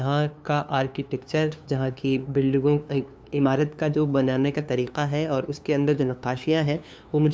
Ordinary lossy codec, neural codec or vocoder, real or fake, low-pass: none; codec, 16 kHz, 2 kbps, FunCodec, trained on LibriTTS, 25 frames a second; fake; none